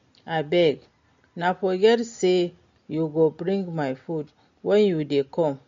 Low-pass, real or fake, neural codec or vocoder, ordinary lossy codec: 7.2 kHz; real; none; MP3, 48 kbps